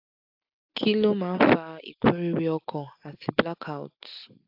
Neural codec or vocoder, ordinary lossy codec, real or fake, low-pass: none; AAC, 48 kbps; real; 5.4 kHz